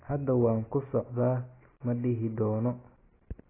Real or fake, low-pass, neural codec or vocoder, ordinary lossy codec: real; 3.6 kHz; none; AAC, 16 kbps